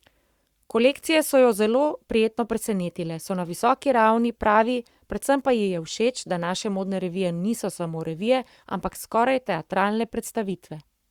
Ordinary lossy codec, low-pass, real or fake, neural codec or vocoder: Opus, 64 kbps; 19.8 kHz; fake; codec, 44.1 kHz, 7.8 kbps, Pupu-Codec